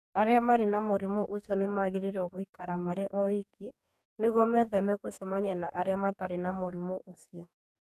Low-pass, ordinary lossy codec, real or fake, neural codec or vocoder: 14.4 kHz; none; fake; codec, 44.1 kHz, 2.6 kbps, DAC